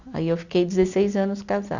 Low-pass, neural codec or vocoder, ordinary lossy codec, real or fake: 7.2 kHz; none; none; real